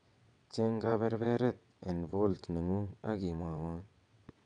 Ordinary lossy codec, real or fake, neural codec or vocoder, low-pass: none; fake; vocoder, 22.05 kHz, 80 mel bands, WaveNeXt; 9.9 kHz